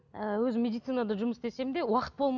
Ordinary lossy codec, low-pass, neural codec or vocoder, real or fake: none; 7.2 kHz; none; real